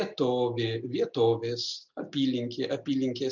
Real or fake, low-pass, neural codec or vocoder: real; 7.2 kHz; none